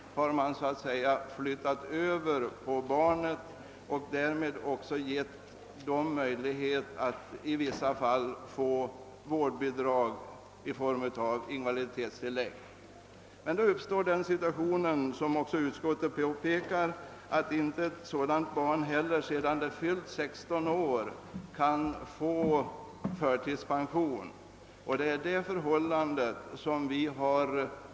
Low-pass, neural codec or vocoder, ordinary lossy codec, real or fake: none; none; none; real